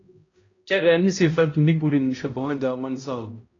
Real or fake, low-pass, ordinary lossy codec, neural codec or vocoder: fake; 7.2 kHz; AAC, 32 kbps; codec, 16 kHz, 0.5 kbps, X-Codec, HuBERT features, trained on balanced general audio